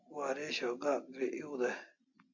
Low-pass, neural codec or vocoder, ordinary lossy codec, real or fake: 7.2 kHz; none; AAC, 48 kbps; real